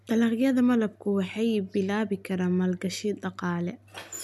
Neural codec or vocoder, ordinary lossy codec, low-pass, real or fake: none; none; 14.4 kHz; real